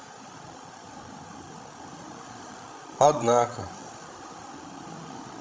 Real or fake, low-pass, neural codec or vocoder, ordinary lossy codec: fake; none; codec, 16 kHz, 16 kbps, FreqCodec, larger model; none